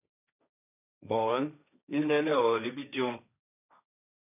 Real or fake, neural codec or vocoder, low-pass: fake; codec, 16 kHz, 1.1 kbps, Voila-Tokenizer; 3.6 kHz